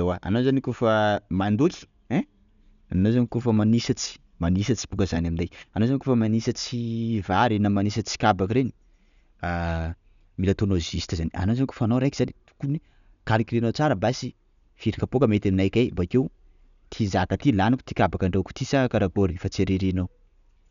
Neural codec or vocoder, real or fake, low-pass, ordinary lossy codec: none; real; 7.2 kHz; none